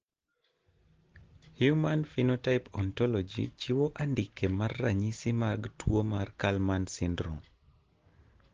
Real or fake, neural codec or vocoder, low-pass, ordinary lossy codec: real; none; 7.2 kHz; Opus, 16 kbps